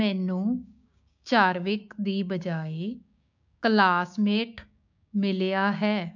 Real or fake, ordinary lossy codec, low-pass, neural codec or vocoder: fake; none; 7.2 kHz; codec, 16 kHz, 6 kbps, DAC